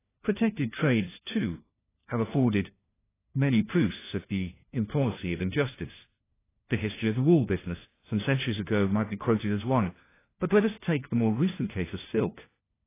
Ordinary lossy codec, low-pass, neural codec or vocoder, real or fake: AAC, 16 kbps; 3.6 kHz; codec, 16 kHz, 1 kbps, FunCodec, trained on LibriTTS, 50 frames a second; fake